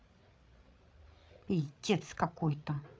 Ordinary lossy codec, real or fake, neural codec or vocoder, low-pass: none; fake; codec, 16 kHz, 8 kbps, FreqCodec, larger model; none